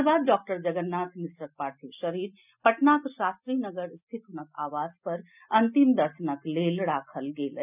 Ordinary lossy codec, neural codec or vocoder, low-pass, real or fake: none; none; 3.6 kHz; real